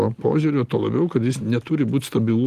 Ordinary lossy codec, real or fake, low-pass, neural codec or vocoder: Opus, 24 kbps; real; 14.4 kHz; none